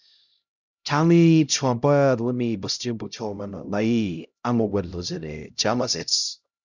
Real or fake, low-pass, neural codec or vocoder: fake; 7.2 kHz; codec, 16 kHz, 0.5 kbps, X-Codec, HuBERT features, trained on LibriSpeech